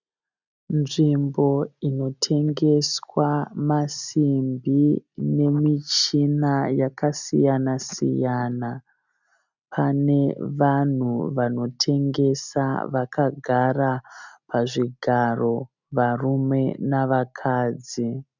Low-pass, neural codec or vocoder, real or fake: 7.2 kHz; none; real